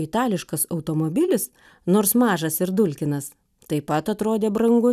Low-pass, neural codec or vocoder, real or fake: 14.4 kHz; none; real